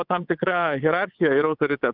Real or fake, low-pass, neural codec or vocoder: fake; 5.4 kHz; vocoder, 22.05 kHz, 80 mel bands, WaveNeXt